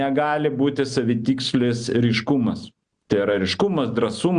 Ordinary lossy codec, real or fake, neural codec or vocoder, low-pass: Opus, 64 kbps; real; none; 9.9 kHz